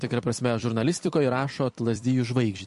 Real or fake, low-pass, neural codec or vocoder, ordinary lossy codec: fake; 14.4 kHz; vocoder, 44.1 kHz, 128 mel bands every 256 samples, BigVGAN v2; MP3, 48 kbps